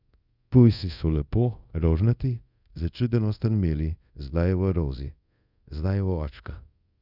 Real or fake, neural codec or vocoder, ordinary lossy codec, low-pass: fake; codec, 24 kHz, 0.5 kbps, DualCodec; Opus, 64 kbps; 5.4 kHz